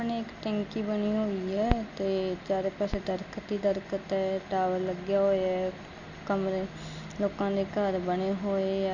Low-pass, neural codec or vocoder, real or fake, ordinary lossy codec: 7.2 kHz; none; real; none